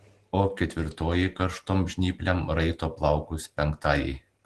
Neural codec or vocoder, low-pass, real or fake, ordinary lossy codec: none; 10.8 kHz; real; Opus, 16 kbps